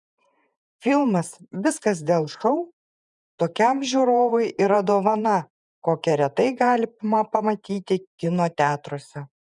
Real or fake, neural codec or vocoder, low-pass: fake; vocoder, 48 kHz, 128 mel bands, Vocos; 10.8 kHz